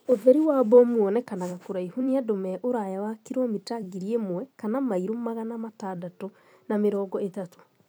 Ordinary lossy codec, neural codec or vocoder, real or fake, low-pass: none; vocoder, 44.1 kHz, 128 mel bands every 256 samples, BigVGAN v2; fake; none